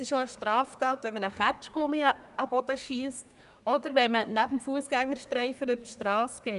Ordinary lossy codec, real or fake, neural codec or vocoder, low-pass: none; fake; codec, 24 kHz, 1 kbps, SNAC; 10.8 kHz